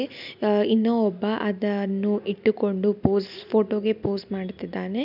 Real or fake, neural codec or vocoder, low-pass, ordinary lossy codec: real; none; 5.4 kHz; none